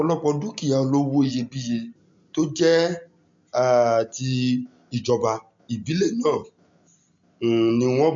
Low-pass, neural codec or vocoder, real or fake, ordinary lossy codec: 7.2 kHz; none; real; MP3, 48 kbps